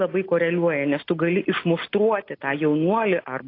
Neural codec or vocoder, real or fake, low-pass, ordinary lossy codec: none; real; 5.4 kHz; AAC, 32 kbps